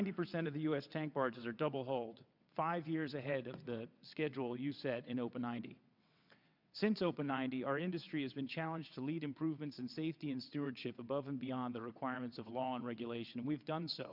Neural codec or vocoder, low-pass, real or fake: vocoder, 22.05 kHz, 80 mel bands, WaveNeXt; 5.4 kHz; fake